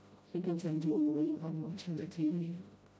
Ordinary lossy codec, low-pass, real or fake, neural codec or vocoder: none; none; fake; codec, 16 kHz, 0.5 kbps, FreqCodec, smaller model